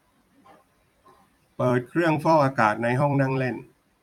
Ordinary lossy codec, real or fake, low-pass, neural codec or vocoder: none; fake; 19.8 kHz; vocoder, 44.1 kHz, 128 mel bands every 256 samples, BigVGAN v2